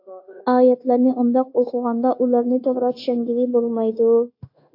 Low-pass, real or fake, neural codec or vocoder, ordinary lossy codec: 5.4 kHz; fake; codec, 16 kHz, 0.9 kbps, LongCat-Audio-Codec; AAC, 32 kbps